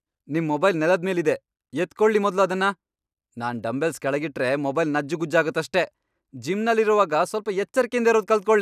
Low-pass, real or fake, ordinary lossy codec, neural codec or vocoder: 14.4 kHz; real; none; none